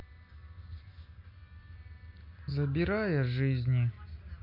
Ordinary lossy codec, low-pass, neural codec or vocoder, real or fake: MP3, 32 kbps; 5.4 kHz; none; real